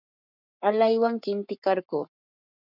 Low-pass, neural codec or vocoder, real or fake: 5.4 kHz; codec, 44.1 kHz, 7.8 kbps, Pupu-Codec; fake